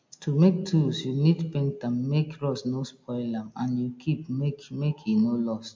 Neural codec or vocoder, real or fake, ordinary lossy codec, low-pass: none; real; MP3, 48 kbps; 7.2 kHz